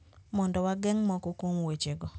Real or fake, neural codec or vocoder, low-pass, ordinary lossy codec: real; none; none; none